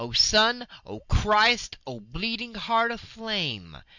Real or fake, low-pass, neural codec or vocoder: real; 7.2 kHz; none